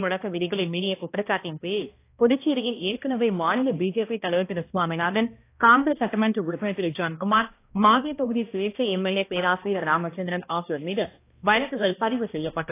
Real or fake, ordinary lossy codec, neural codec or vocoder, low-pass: fake; AAC, 24 kbps; codec, 16 kHz, 1 kbps, X-Codec, HuBERT features, trained on balanced general audio; 3.6 kHz